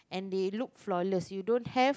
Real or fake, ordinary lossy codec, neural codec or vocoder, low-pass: real; none; none; none